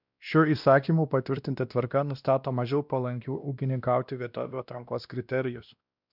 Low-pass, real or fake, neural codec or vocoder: 5.4 kHz; fake; codec, 16 kHz, 1 kbps, X-Codec, WavLM features, trained on Multilingual LibriSpeech